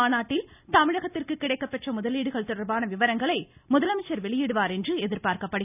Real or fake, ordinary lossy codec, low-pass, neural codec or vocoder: real; none; 3.6 kHz; none